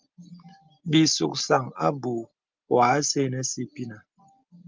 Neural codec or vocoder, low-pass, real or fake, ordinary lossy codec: none; 7.2 kHz; real; Opus, 32 kbps